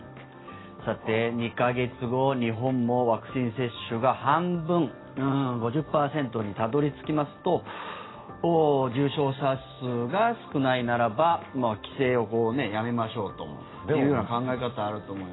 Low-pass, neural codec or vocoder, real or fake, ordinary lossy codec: 7.2 kHz; none; real; AAC, 16 kbps